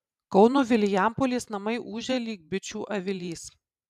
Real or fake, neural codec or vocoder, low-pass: fake; vocoder, 44.1 kHz, 128 mel bands every 512 samples, BigVGAN v2; 14.4 kHz